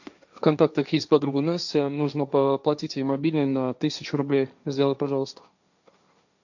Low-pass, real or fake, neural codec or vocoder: 7.2 kHz; fake; codec, 16 kHz, 1.1 kbps, Voila-Tokenizer